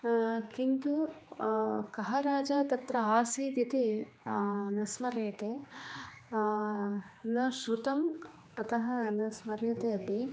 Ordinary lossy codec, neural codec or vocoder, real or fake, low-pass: none; codec, 16 kHz, 2 kbps, X-Codec, HuBERT features, trained on general audio; fake; none